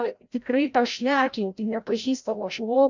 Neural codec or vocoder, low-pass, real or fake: codec, 16 kHz, 0.5 kbps, FreqCodec, larger model; 7.2 kHz; fake